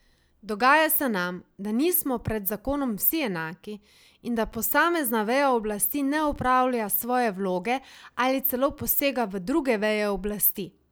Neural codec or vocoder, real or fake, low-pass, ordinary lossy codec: none; real; none; none